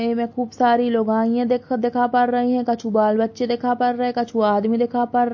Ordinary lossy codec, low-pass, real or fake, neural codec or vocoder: MP3, 32 kbps; 7.2 kHz; real; none